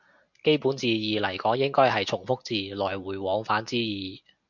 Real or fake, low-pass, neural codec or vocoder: real; 7.2 kHz; none